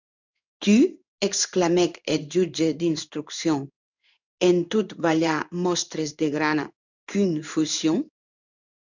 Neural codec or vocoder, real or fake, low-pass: codec, 16 kHz in and 24 kHz out, 1 kbps, XY-Tokenizer; fake; 7.2 kHz